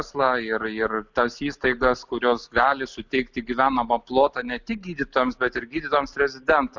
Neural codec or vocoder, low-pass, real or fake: none; 7.2 kHz; real